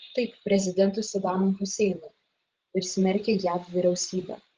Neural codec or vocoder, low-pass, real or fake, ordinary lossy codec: codec, 16 kHz, 16 kbps, FreqCodec, larger model; 7.2 kHz; fake; Opus, 16 kbps